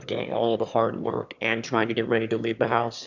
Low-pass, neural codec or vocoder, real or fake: 7.2 kHz; autoencoder, 22.05 kHz, a latent of 192 numbers a frame, VITS, trained on one speaker; fake